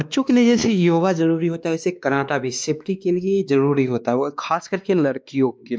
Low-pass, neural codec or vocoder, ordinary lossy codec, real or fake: none; codec, 16 kHz, 2 kbps, X-Codec, WavLM features, trained on Multilingual LibriSpeech; none; fake